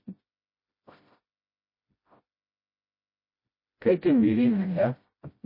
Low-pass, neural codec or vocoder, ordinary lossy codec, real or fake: 5.4 kHz; codec, 16 kHz, 0.5 kbps, FreqCodec, smaller model; MP3, 24 kbps; fake